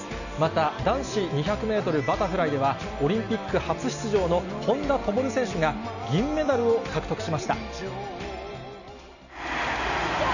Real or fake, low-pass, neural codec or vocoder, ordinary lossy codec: real; 7.2 kHz; none; none